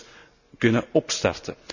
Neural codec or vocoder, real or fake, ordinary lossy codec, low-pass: none; real; none; 7.2 kHz